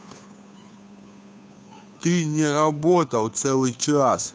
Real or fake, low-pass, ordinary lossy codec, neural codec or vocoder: fake; none; none; codec, 16 kHz, 2 kbps, FunCodec, trained on Chinese and English, 25 frames a second